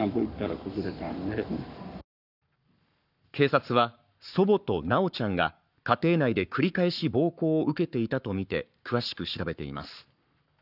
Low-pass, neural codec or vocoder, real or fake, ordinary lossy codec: 5.4 kHz; codec, 44.1 kHz, 7.8 kbps, Pupu-Codec; fake; none